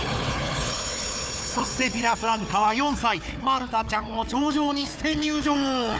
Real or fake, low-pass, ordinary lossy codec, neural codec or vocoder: fake; none; none; codec, 16 kHz, 4 kbps, FunCodec, trained on Chinese and English, 50 frames a second